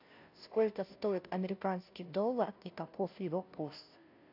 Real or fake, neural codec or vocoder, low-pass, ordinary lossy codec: fake; codec, 16 kHz, 0.5 kbps, FunCodec, trained on Chinese and English, 25 frames a second; 5.4 kHz; AAC, 48 kbps